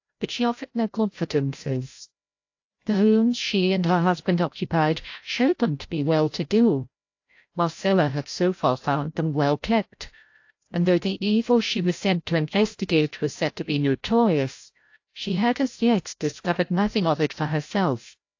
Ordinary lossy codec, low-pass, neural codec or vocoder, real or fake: AAC, 48 kbps; 7.2 kHz; codec, 16 kHz, 0.5 kbps, FreqCodec, larger model; fake